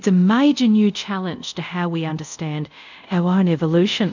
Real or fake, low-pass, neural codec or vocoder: fake; 7.2 kHz; codec, 24 kHz, 0.5 kbps, DualCodec